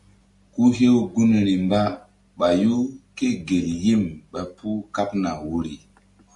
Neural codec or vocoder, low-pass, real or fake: none; 10.8 kHz; real